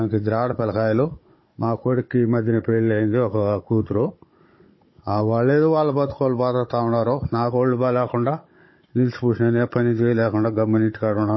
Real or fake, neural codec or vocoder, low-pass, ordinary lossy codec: fake; codec, 16 kHz, 16 kbps, FunCodec, trained on Chinese and English, 50 frames a second; 7.2 kHz; MP3, 24 kbps